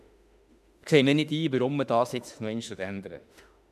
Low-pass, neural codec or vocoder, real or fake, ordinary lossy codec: 14.4 kHz; autoencoder, 48 kHz, 32 numbers a frame, DAC-VAE, trained on Japanese speech; fake; none